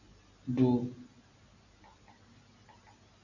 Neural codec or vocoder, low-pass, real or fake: none; 7.2 kHz; real